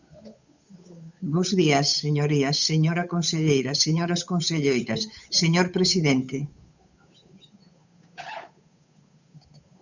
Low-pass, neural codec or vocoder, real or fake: 7.2 kHz; codec, 16 kHz, 8 kbps, FunCodec, trained on Chinese and English, 25 frames a second; fake